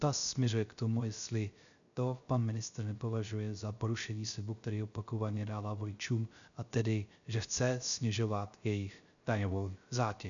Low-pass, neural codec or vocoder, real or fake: 7.2 kHz; codec, 16 kHz, 0.3 kbps, FocalCodec; fake